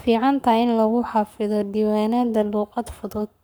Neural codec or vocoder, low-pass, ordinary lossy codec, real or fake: codec, 44.1 kHz, 7.8 kbps, Pupu-Codec; none; none; fake